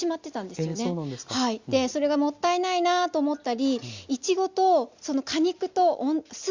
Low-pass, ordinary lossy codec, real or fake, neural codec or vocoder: 7.2 kHz; Opus, 64 kbps; real; none